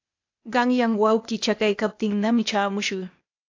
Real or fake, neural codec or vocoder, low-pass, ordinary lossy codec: fake; codec, 16 kHz, 0.8 kbps, ZipCodec; 7.2 kHz; AAC, 48 kbps